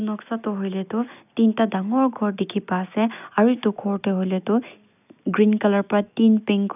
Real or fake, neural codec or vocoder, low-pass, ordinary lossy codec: real; none; 3.6 kHz; none